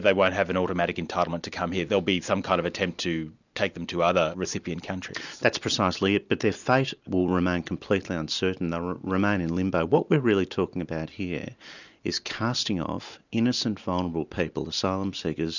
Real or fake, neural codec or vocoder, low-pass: real; none; 7.2 kHz